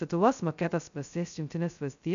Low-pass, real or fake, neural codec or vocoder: 7.2 kHz; fake; codec, 16 kHz, 0.2 kbps, FocalCodec